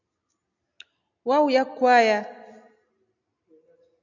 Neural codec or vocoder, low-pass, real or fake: none; 7.2 kHz; real